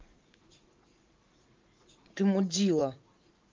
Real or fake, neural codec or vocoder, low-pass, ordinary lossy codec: fake; vocoder, 44.1 kHz, 80 mel bands, Vocos; 7.2 kHz; Opus, 24 kbps